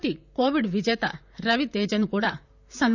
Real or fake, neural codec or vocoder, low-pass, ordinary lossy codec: fake; vocoder, 44.1 kHz, 128 mel bands, Pupu-Vocoder; 7.2 kHz; none